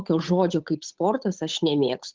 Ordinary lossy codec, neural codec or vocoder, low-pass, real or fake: Opus, 32 kbps; codec, 16 kHz, 8 kbps, FunCodec, trained on Chinese and English, 25 frames a second; 7.2 kHz; fake